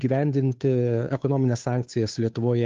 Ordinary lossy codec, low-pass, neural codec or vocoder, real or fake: Opus, 32 kbps; 7.2 kHz; codec, 16 kHz, 2 kbps, FunCodec, trained on Chinese and English, 25 frames a second; fake